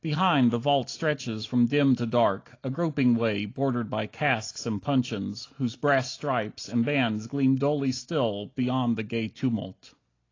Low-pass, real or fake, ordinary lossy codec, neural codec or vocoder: 7.2 kHz; real; AAC, 32 kbps; none